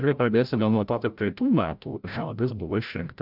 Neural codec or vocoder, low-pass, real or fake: codec, 16 kHz, 0.5 kbps, FreqCodec, larger model; 5.4 kHz; fake